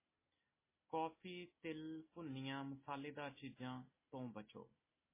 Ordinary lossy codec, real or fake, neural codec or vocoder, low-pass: MP3, 16 kbps; real; none; 3.6 kHz